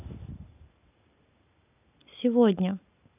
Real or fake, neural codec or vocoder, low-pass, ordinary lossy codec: real; none; 3.6 kHz; none